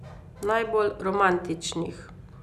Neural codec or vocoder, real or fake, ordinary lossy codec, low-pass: none; real; none; 14.4 kHz